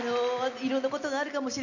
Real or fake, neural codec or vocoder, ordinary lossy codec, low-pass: real; none; none; 7.2 kHz